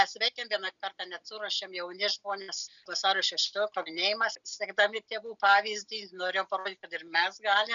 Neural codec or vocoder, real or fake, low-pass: none; real; 7.2 kHz